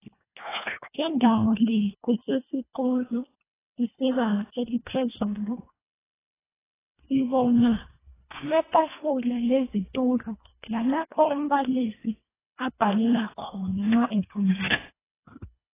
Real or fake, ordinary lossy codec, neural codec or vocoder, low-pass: fake; AAC, 16 kbps; codec, 24 kHz, 1.5 kbps, HILCodec; 3.6 kHz